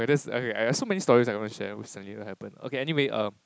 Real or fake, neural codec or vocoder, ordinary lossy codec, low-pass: real; none; none; none